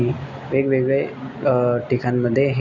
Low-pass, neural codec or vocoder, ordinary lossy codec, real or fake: 7.2 kHz; none; none; real